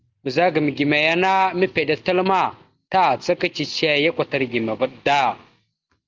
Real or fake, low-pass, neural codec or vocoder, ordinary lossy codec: real; 7.2 kHz; none; Opus, 32 kbps